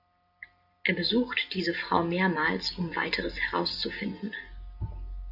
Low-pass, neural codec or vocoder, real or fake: 5.4 kHz; none; real